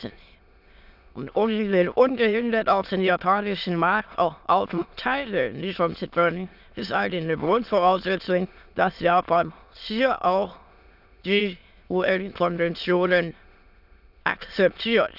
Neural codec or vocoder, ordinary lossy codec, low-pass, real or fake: autoencoder, 22.05 kHz, a latent of 192 numbers a frame, VITS, trained on many speakers; none; 5.4 kHz; fake